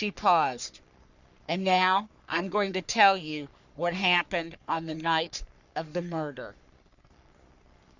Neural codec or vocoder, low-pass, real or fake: codec, 44.1 kHz, 3.4 kbps, Pupu-Codec; 7.2 kHz; fake